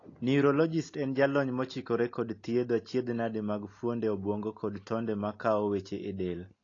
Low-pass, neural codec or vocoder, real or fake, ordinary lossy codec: 7.2 kHz; none; real; AAC, 32 kbps